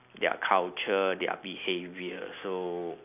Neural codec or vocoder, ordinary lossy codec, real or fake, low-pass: none; none; real; 3.6 kHz